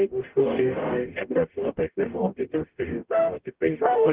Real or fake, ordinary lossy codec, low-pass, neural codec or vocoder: fake; Opus, 16 kbps; 3.6 kHz; codec, 44.1 kHz, 0.9 kbps, DAC